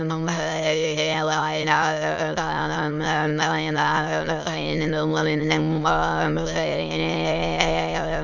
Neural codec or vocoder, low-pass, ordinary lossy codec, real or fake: autoencoder, 22.05 kHz, a latent of 192 numbers a frame, VITS, trained on many speakers; 7.2 kHz; Opus, 64 kbps; fake